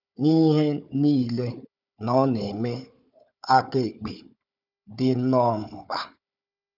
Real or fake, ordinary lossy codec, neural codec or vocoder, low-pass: fake; none; codec, 16 kHz, 4 kbps, FunCodec, trained on Chinese and English, 50 frames a second; 5.4 kHz